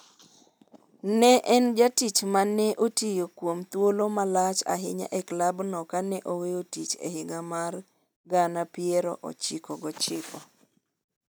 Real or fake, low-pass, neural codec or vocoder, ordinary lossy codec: real; none; none; none